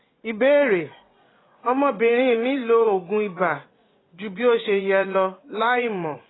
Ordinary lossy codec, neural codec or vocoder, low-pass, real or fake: AAC, 16 kbps; vocoder, 24 kHz, 100 mel bands, Vocos; 7.2 kHz; fake